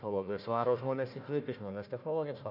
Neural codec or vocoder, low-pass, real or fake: codec, 16 kHz, 1 kbps, FunCodec, trained on Chinese and English, 50 frames a second; 5.4 kHz; fake